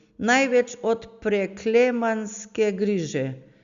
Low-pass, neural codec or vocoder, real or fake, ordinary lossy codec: 7.2 kHz; none; real; Opus, 64 kbps